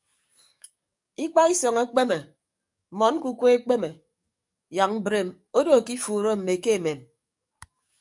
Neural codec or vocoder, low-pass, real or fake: codec, 44.1 kHz, 7.8 kbps, DAC; 10.8 kHz; fake